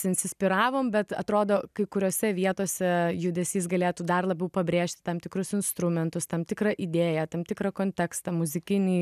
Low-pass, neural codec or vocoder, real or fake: 14.4 kHz; none; real